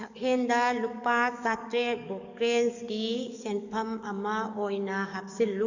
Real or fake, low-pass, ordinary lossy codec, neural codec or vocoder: fake; 7.2 kHz; none; codec, 44.1 kHz, 7.8 kbps, DAC